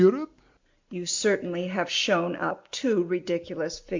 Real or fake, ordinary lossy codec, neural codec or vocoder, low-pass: real; MP3, 64 kbps; none; 7.2 kHz